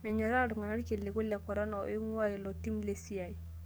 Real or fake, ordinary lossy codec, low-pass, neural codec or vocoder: fake; none; none; codec, 44.1 kHz, 7.8 kbps, DAC